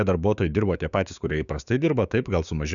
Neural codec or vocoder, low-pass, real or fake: codec, 16 kHz, 6 kbps, DAC; 7.2 kHz; fake